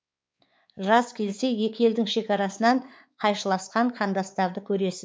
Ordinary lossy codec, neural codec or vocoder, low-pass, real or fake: none; codec, 16 kHz, 4 kbps, X-Codec, WavLM features, trained on Multilingual LibriSpeech; none; fake